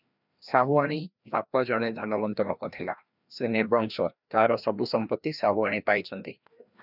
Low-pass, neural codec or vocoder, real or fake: 5.4 kHz; codec, 16 kHz, 1 kbps, FreqCodec, larger model; fake